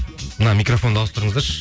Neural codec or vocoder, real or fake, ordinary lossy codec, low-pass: none; real; none; none